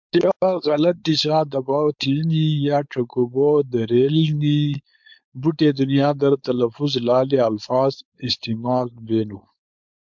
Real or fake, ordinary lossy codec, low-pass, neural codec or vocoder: fake; MP3, 64 kbps; 7.2 kHz; codec, 16 kHz, 4.8 kbps, FACodec